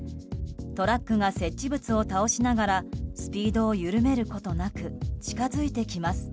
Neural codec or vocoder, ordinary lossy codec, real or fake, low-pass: none; none; real; none